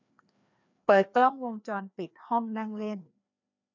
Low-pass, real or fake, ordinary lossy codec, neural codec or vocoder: 7.2 kHz; fake; none; codec, 16 kHz, 2 kbps, FreqCodec, larger model